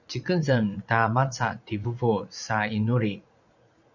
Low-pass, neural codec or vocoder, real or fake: 7.2 kHz; vocoder, 44.1 kHz, 80 mel bands, Vocos; fake